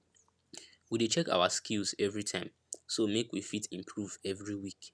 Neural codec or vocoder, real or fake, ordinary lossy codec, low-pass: none; real; none; none